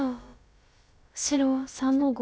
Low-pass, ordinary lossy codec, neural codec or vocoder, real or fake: none; none; codec, 16 kHz, about 1 kbps, DyCAST, with the encoder's durations; fake